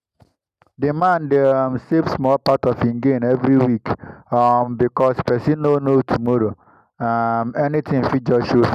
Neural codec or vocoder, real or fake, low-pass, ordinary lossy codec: none; real; 14.4 kHz; none